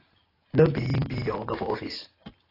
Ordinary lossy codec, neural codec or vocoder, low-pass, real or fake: AAC, 24 kbps; none; 5.4 kHz; real